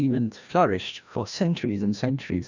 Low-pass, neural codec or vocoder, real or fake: 7.2 kHz; codec, 16 kHz, 1 kbps, FreqCodec, larger model; fake